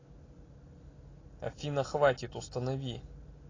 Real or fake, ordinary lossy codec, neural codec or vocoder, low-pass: real; AAC, 32 kbps; none; 7.2 kHz